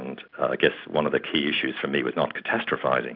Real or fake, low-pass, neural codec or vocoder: real; 5.4 kHz; none